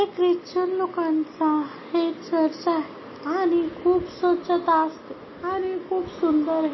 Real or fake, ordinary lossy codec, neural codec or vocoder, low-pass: real; MP3, 24 kbps; none; 7.2 kHz